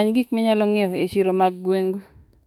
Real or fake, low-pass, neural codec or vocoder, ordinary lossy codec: fake; 19.8 kHz; autoencoder, 48 kHz, 32 numbers a frame, DAC-VAE, trained on Japanese speech; none